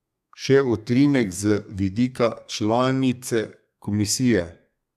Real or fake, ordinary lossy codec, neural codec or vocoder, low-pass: fake; none; codec, 32 kHz, 1.9 kbps, SNAC; 14.4 kHz